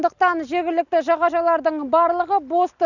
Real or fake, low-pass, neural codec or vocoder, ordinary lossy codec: real; 7.2 kHz; none; none